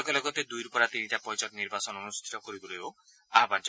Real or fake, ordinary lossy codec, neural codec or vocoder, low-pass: real; none; none; none